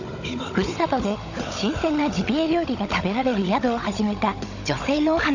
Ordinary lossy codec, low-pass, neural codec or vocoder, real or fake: none; 7.2 kHz; codec, 16 kHz, 16 kbps, FunCodec, trained on Chinese and English, 50 frames a second; fake